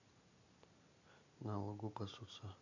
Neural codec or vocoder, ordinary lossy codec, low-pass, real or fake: none; none; 7.2 kHz; real